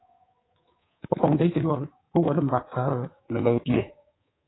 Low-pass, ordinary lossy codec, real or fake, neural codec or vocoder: 7.2 kHz; AAC, 16 kbps; fake; codec, 24 kHz, 3.1 kbps, DualCodec